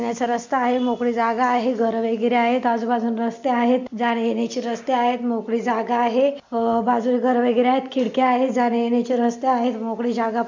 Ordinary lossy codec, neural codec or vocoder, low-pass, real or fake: MP3, 64 kbps; none; 7.2 kHz; real